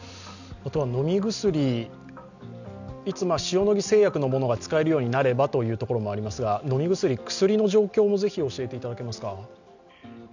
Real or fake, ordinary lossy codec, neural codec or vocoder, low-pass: real; none; none; 7.2 kHz